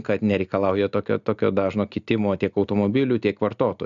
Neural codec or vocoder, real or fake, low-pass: none; real; 7.2 kHz